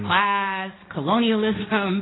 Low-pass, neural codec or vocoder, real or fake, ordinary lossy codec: 7.2 kHz; codec, 16 kHz, 1.1 kbps, Voila-Tokenizer; fake; AAC, 16 kbps